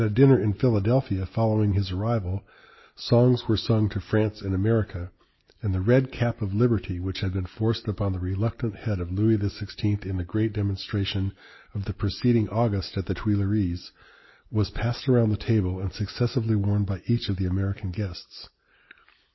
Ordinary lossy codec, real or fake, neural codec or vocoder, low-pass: MP3, 24 kbps; real; none; 7.2 kHz